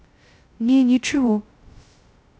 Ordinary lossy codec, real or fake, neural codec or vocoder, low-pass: none; fake; codec, 16 kHz, 0.2 kbps, FocalCodec; none